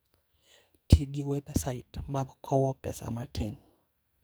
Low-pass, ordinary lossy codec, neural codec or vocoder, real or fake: none; none; codec, 44.1 kHz, 2.6 kbps, SNAC; fake